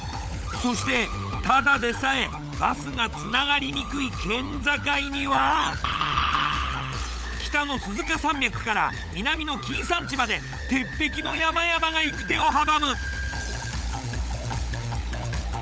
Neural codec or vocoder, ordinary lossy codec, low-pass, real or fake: codec, 16 kHz, 16 kbps, FunCodec, trained on LibriTTS, 50 frames a second; none; none; fake